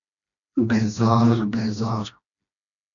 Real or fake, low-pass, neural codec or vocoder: fake; 7.2 kHz; codec, 16 kHz, 1 kbps, FreqCodec, smaller model